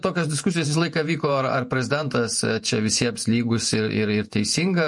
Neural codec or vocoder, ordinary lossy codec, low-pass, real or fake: vocoder, 48 kHz, 128 mel bands, Vocos; MP3, 48 kbps; 10.8 kHz; fake